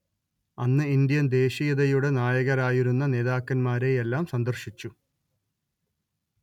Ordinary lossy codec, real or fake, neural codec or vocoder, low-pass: none; real; none; 19.8 kHz